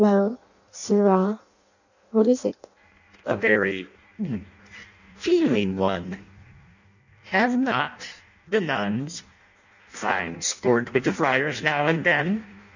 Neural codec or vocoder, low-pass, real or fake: codec, 16 kHz in and 24 kHz out, 0.6 kbps, FireRedTTS-2 codec; 7.2 kHz; fake